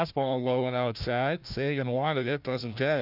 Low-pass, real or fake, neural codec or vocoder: 5.4 kHz; fake; codec, 16 kHz, 1 kbps, FunCodec, trained on Chinese and English, 50 frames a second